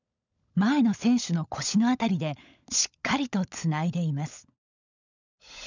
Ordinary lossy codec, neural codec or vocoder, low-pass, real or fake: none; codec, 16 kHz, 16 kbps, FunCodec, trained on LibriTTS, 50 frames a second; 7.2 kHz; fake